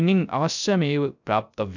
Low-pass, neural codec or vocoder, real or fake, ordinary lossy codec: 7.2 kHz; codec, 16 kHz, 0.3 kbps, FocalCodec; fake; none